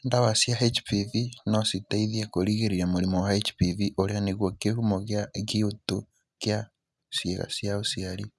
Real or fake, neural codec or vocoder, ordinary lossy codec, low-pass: real; none; none; none